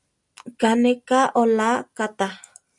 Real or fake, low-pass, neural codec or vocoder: real; 10.8 kHz; none